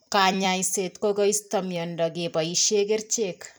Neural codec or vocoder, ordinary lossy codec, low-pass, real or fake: vocoder, 44.1 kHz, 128 mel bands every 256 samples, BigVGAN v2; none; none; fake